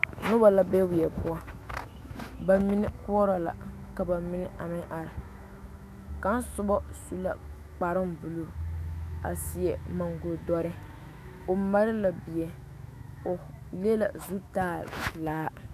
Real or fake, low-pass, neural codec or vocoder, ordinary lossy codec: fake; 14.4 kHz; autoencoder, 48 kHz, 128 numbers a frame, DAC-VAE, trained on Japanese speech; AAC, 96 kbps